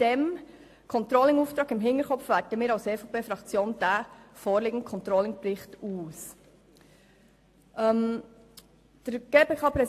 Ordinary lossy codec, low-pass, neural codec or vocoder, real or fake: AAC, 64 kbps; 14.4 kHz; none; real